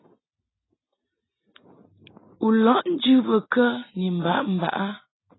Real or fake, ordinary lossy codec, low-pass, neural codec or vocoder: real; AAC, 16 kbps; 7.2 kHz; none